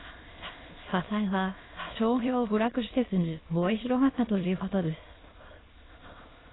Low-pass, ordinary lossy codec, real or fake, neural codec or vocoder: 7.2 kHz; AAC, 16 kbps; fake; autoencoder, 22.05 kHz, a latent of 192 numbers a frame, VITS, trained on many speakers